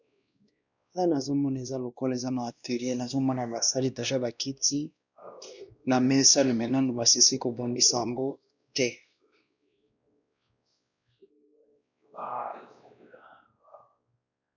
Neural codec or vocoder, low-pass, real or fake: codec, 16 kHz, 1 kbps, X-Codec, WavLM features, trained on Multilingual LibriSpeech; 7.2 kHz; fake